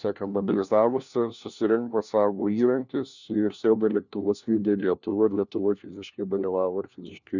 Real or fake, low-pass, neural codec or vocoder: fake; 7.2 kHz; codec, 16 kHz, 1 kbps, FunCodec, trained on LibriTTS, 50 frames a second